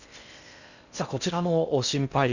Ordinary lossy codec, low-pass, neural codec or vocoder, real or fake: none; 7.2 kHz; codec, 16 kHz in and 24 kHz out, 0.6 kbps, FocalCodec, streaming, 4096 codes; fake